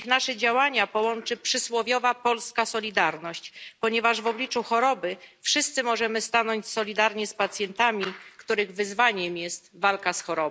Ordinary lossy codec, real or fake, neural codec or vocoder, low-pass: none; real; none; none